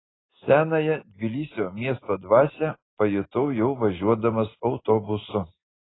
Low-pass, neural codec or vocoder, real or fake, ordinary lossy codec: 7.2 kHz; none; real; AAC, 16 kbps